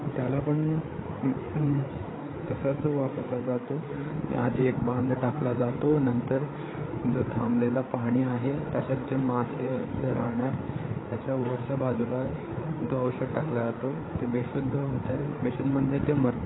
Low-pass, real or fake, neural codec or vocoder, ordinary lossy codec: 7.2 kHz; fake; codec, 16 kHz, 8 kbps, FreqCodec, larger model; AAC, 16 kbps